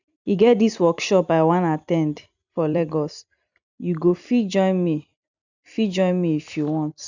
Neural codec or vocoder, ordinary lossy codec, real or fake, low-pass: vocoder, 44.1 kHz, 128 mel bands every 256 samples, BigVGAN v2; none; fake; 7.2 kHz